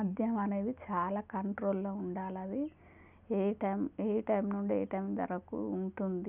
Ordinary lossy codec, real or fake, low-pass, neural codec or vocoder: none; real; 3.6 kHz; none